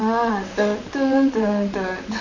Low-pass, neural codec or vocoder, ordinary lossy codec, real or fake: 7.2 kHz; vocoder, 44.1 kHz, 128 mel bands, Pupu-Vocoder; none; fake